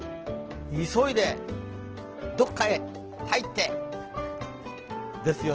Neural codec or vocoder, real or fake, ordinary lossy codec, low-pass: none; real; Opus, 16 kbps; 7.2 kHz